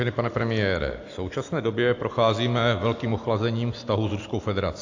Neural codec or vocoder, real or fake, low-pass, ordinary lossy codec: none; real; 7.2 kHz; AAC, 48 kbps